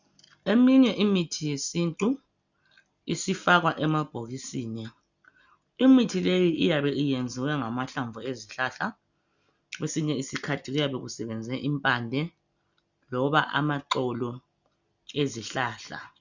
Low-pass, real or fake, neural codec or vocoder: 7.2 kHz; real; none